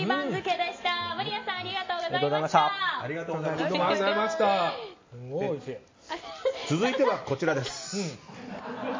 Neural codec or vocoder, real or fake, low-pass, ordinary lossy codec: none; real; 7.2 kHz; MP3, 32 kbps